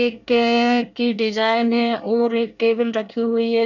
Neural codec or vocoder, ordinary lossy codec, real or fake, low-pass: codec, 24 kHz, 1 kbps, SNAC; none; fake; 7.2 kHz